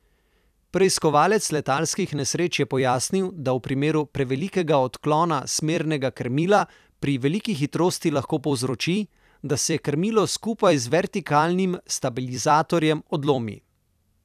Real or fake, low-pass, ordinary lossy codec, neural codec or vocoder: fake; 14.4 kHz; none; vocoder, 44.1 kHz, 128 mel bands every 256 samples, BigVGAN v2